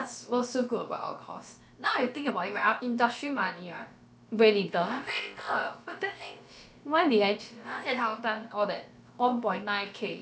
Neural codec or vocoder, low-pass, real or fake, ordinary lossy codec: codec, 16 kHz, about 1 kbps, DyCAST, with the encoder's durations; none; fake; none